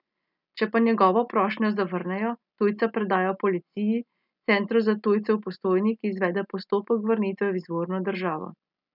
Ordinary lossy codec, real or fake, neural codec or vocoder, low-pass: none; real; none; 5.4 kHz